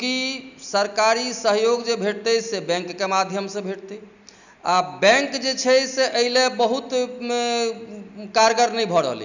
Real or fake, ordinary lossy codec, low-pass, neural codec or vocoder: real; none; 7.2 kHz; none